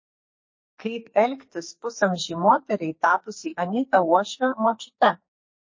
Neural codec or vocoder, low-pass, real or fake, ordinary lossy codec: codec, 44.1 kHz, 2.6 kbps, SNAC; 7.2 kHz; fake; MP3, 32 kbps